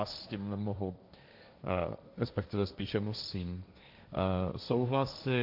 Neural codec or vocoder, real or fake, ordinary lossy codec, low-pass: codec, 16 kHz, 1.1 kbps, Voila-Tokenizer; fake; AAC, 48 kbps; 5.4 kHz